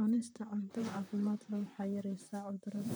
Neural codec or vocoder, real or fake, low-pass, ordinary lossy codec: vocoder, 44.1 kHz, 128 mel bands, Pupu-Vocoder; fake; none; none